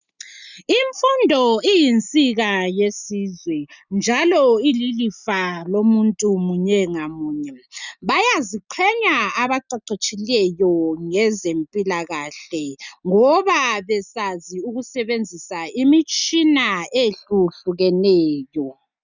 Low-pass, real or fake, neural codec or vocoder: 7.2 kHz; real; none